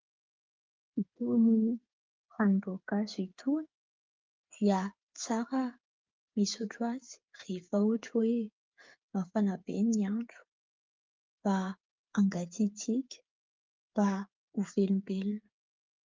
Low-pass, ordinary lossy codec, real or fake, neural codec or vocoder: 7.2 kHz; Opus, 24 kbps; fake; codec, 16 kHz, 8 kbps, FreqCodec, smaller model